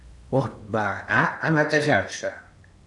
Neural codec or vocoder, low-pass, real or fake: codec, 16 kHz in and 24 kHz out, 0.8 kbps, FocalCodec, streaming, 65536 codes; 10.8 kHz; fake